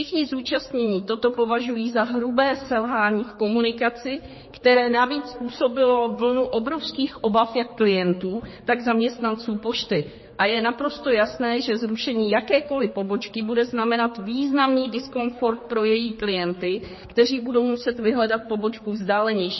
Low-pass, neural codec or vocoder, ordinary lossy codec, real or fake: 7.2 kHz; codec, 16 kHz, 4 kbps, X-Codec, HuBERT features, trained on general audio; MP3, 24 kbps; fake